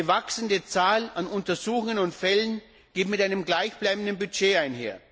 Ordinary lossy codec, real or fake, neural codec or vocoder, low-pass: none; real; none; none